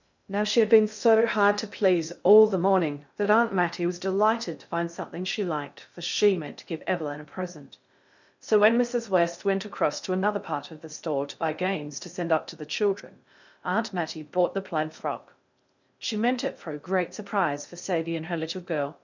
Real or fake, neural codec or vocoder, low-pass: fake; codec, 16 kHz in and 24 kHz out, 0.6 kbps, FocalCodec, streaming, 2048 codes; 7.2 kHz